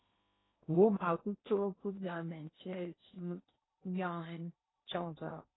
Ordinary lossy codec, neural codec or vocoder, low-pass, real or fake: AAC, 16 kbps; codec, 16 kHz in and 24 kHz out, 0.8 kbps, FocalCodec, streaming, 65536 codes; 7.2 kHz; fake